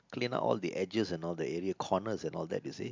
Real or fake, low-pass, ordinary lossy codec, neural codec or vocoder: real; 7.2 kHz; MP3, 64 kbps; none